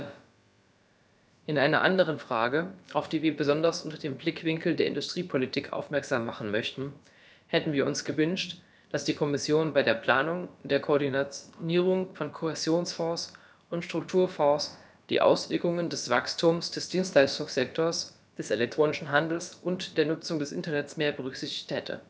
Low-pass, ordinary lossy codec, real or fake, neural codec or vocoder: none; none; fake; codec, 16 kHz, about 1 kbps, DyCAST, with the encoder's durations